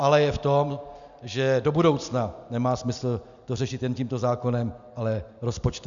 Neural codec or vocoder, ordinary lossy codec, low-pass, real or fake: none; MP3, 96 kbps; 7.2 kHz; real